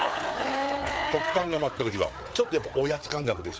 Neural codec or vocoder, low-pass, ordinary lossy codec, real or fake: codec, 16 kHz, 4 kbps, FreqCodec, larger model; none; none; fake